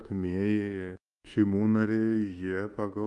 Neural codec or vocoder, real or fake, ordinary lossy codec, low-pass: codec, 24 kHz, 1.2 kbps, DualCodec; fake; Opus, 32 kbps; 10.8 kHz